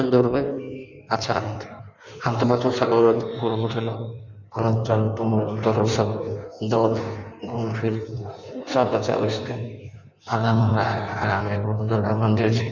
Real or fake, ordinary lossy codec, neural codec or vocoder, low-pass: fake; none; codec, 16 kHz in and 24 kHz out, 1.1 kbps, FireRedTTS-2 codec; 7.2 kHz